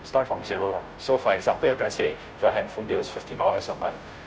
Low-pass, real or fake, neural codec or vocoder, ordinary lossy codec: none; fake; codec, 16 kHz, 0.5 kbps, FunCodec, trained on Chinese and English, 25 frames a second; none